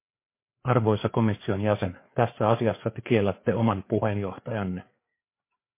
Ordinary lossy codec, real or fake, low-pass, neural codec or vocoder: MP3, 24 kbps; fake; 3.6 kHz; codec, 16 kHz, 4 kbps, FreqCodec, larger model